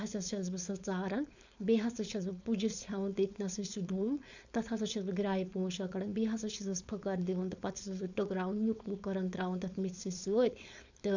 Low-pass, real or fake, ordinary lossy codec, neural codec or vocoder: 7.2 kHz; fake; none; codec, 16 kHz, 4.8 kbps, FACodec